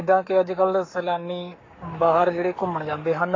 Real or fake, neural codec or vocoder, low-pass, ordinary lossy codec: fake; codec, 44.1 kHz, 7.8 kbps, Pupu-Codec; 7.2 kHz; AAC, 32 kbps